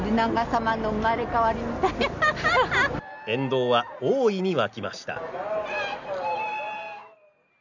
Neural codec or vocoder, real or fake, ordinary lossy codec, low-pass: none; real; none; 7.2 kHz